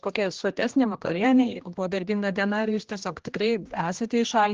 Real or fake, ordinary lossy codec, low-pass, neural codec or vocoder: fake; Opus, 16 kbps; 7.2 kHz; codec, 16 kHz, 1 kbps, X-Codec, HuBERT features, trained on general audio